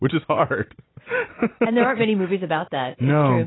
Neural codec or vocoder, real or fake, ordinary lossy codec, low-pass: none; real; AAC, 16 kbps; 7.2 kHz